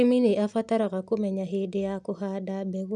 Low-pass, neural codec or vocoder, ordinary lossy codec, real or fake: none; none; none; real